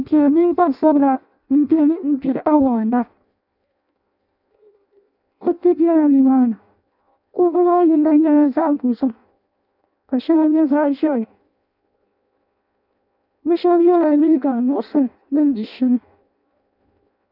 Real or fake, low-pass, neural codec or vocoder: fake; 5.4 kHz; codec, 16 kHz in and 24 kHz out, 0.6 kbps, FireRedTTS-2 codec